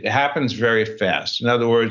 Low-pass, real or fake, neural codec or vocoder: 7.2 kHz; real; none